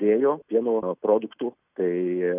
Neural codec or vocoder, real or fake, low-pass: none; real; 3.6 kHz